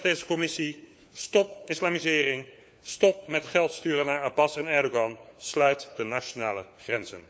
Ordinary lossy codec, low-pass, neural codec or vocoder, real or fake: none; none; codec, 16 kHz, 4 kbps, FunCodec, trained on LibriTTS, 50 frames a second; fake